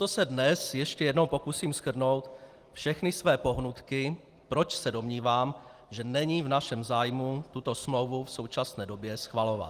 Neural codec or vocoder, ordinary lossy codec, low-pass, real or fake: none; Opus, 24 kbps; 14.4 kHz; real